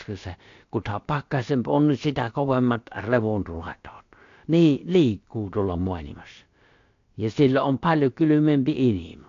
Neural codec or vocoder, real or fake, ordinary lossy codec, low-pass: codec, 16 kHz, about 1 kbps, DyCAST, with the encoder's durations; fake; AAC, 48 kbps; 7.2 kHz